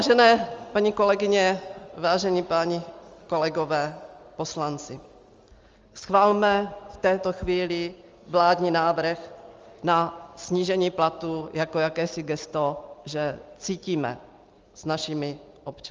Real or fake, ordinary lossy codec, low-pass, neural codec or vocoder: real; Opus, 32 kbps; 7.2 kHz; none